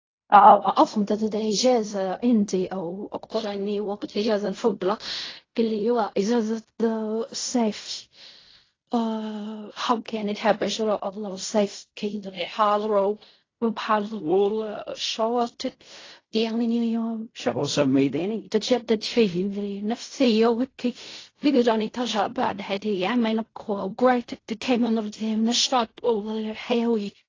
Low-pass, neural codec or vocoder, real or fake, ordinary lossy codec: 7.2 kHz; codec, 16 kHz in and 24 kHz out, 0.4 kbps, LongCat-Audio-Codec, fine tuned four codebook decoder; fake; AAC, 32 kbps